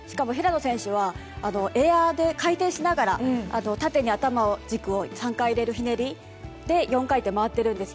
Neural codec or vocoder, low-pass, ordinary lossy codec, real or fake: none; none; none; real